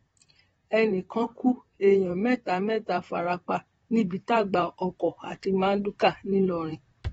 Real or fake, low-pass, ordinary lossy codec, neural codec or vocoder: fake; 19.8 kHz; AAC, 24 kbps; vocoder, 44.1 kHz, 128 mel bands, Pupu-Vocoder